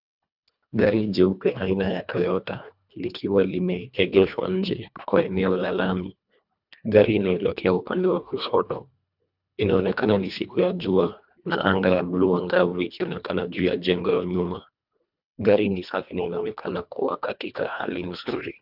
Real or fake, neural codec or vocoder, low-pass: fake; codec, 24 kHz, 1.5 kbps, HILCodec; 5.4 kHz